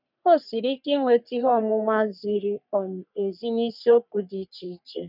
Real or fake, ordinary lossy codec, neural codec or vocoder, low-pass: fake; none; codec, 44.1 kHz, 3.4 kbps, Pupu-Codec; 5.4 kHz